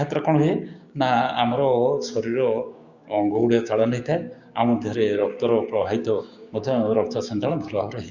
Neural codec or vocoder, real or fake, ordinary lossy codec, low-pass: codec, 16 kHz, 6 kbps, DAC; fake; Opus, 64 kbps; 7.2 kHz